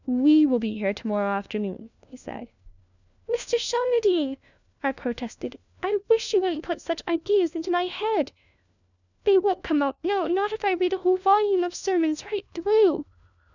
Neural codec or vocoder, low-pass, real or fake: codec, 16 kHz, 1 kbps, FunCodec, trained on LibriTTS, 50 frames a second; 7.2 kHz; fake